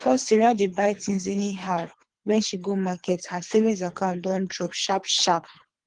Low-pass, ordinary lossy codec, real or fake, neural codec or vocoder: 9.9 kHz; Opus, 24 kbps; fake; codec, 24 kHz, 3 kbps, HILCodec